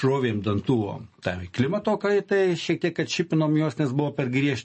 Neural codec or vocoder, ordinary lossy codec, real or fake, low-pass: none; MP3, 32 kbps; real; 10.8 kHz